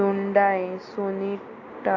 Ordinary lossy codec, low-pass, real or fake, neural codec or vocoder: AAC, 48 kbps; 7.2 kHz; real; none